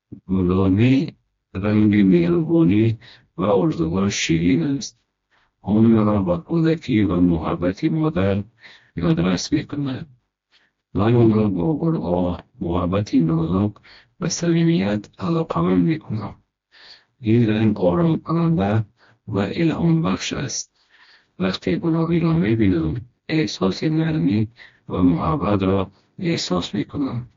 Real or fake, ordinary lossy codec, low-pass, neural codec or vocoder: fake; AAC, 48 kbps; 7.2 kHz; codec, 16 kHz, 1 kbps, FreqCodec, smaller model